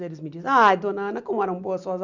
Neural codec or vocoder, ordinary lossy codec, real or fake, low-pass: none; none; real; 7.2 kHz